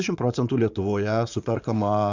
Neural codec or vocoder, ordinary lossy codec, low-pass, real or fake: vocoder, 44.1 kHz, 80 mel bands, Vocos; Opus, 64 kbps; 7.2 kHz; fake